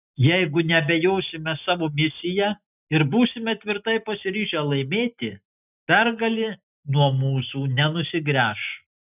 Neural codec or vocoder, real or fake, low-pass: none; real; 3.6 kHz